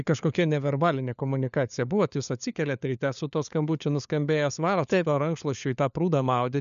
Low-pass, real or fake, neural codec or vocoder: 7.2 kHz; fake; codec, 16 kHz, 4 kbps, FunCodec, trained on LibriTTS, 50 frames a second